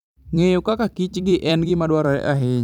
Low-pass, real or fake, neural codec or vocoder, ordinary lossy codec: 19.8 kHz; fake; vocoder, 44.1 kHz, 128 mel bands every 256 samples, BigVGAN v2; none